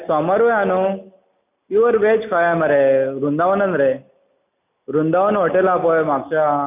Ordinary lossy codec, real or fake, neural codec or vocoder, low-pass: none; real; none; 3.6 kHz